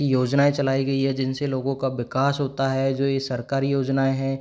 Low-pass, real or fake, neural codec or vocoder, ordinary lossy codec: none; real; none; none